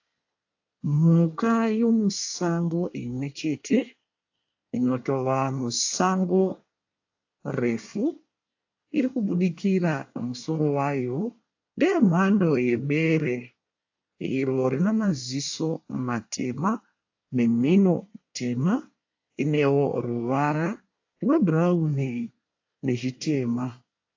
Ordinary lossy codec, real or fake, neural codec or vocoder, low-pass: AAC, 48 kbps; fake; codec, 24 kHz, 1 kbps, SNAC; 7.2 kHz